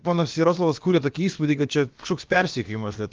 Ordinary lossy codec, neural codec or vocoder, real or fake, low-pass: Opus, 32 kbps; codec, 16 kHz, about 1 kbps, DyCAST, with the encoder's durations; fake; 7.2 kHz